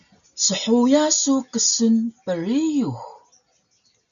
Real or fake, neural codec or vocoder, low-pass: real; none; 7.2 kHz